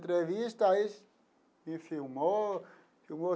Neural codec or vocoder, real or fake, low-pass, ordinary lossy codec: none; real; none; none